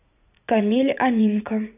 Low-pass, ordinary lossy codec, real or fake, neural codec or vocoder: 3.6 kHz; none; fake; vocoder, 44.1 kHz, 80 mel bands, Vocos